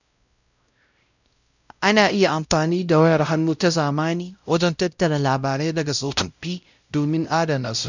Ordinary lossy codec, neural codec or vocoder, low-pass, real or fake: none; codec, 16 kHz, 0.5 kbps, X-Codec, WavLM features, trained on Multilingual LibriSpeech; 7.2 kHz; fake